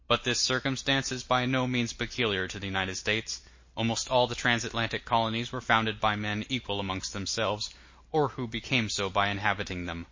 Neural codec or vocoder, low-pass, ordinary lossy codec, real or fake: none; 7.2 kHz; MP3, 32 kbps; real